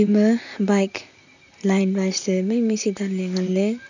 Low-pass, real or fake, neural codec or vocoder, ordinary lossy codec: 7.2 kHz; fake; vocoder, 44.1 kHz, 128 mel bands, Pupu-Vocoder; none